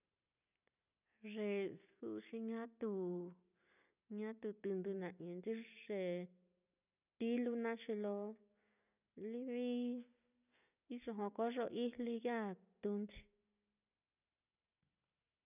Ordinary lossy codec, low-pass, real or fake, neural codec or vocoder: none; 3.6 kHz; real; none